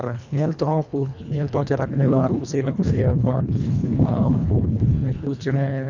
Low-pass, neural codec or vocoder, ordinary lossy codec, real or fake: 7.2 kHz; codec, 24 kHz, 1.5 kbps, HILCodec; none; fake